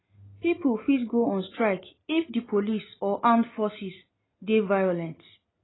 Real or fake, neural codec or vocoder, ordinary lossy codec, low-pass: real; none; AAC, 16 kbps; 7.2 kHz